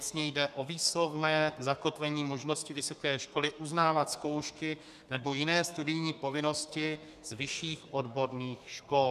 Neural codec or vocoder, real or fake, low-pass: codec, 32 kHz, 1.9 kbps, SNAC; fake; 14.4 kHz